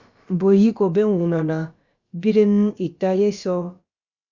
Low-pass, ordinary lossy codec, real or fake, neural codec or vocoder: 7.2 kHz; Opus, 64 kbps; fake; codec, 16 kHz, about 1 kbps, DyCAST, with the encoder's durations